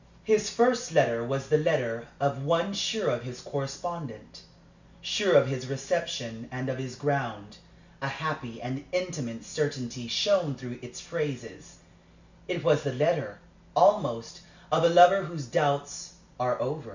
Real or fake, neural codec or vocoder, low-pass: real; none; 7.2 kHz